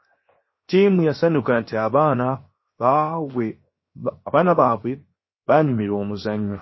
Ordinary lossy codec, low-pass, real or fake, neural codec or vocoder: MP3, 24 kbps; 7.2 kHz; fake; codec, 16 kHz, 0.7 kbps, FocalCodec